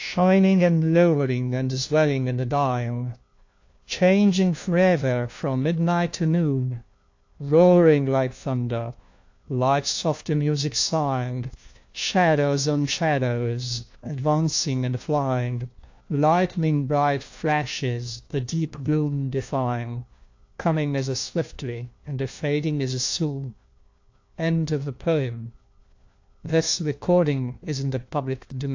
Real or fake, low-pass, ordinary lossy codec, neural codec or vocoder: fake; 7.2 kHz; AAC, 48 kbps; codec, 16 kHz, 1 kbps, FunCodec, trained on LibriTTS, 50 frames a second